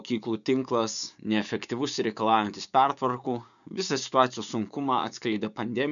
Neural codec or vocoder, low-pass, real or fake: codec, 16 kHz, 6 kbps, DAC; 7.2 kHz; fake